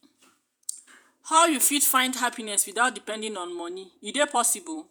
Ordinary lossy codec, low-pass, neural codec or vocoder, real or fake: none; none; vocoder, 48 kHz, 128 mel bands, Vocos; fake